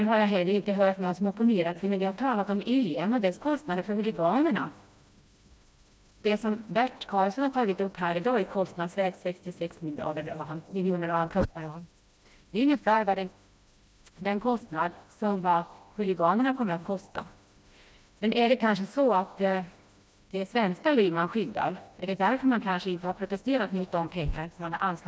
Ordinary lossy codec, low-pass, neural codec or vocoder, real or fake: none; none; codec, 16 kHz, 1 kbps, FreqCodec, smaller model; fake